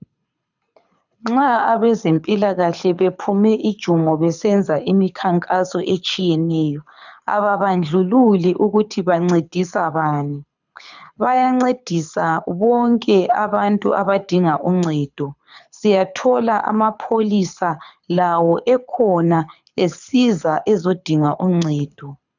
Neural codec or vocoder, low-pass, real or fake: codec, 24 kHz, 6 kbps, HILCodec; 7.2 kHz; fake